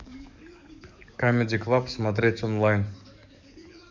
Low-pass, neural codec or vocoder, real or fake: 7.2 kHz; codec, 44.1 kHz, 7.8 kbps, DAC; fake